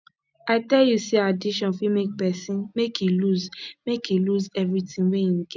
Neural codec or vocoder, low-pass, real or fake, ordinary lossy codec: none; none; real; none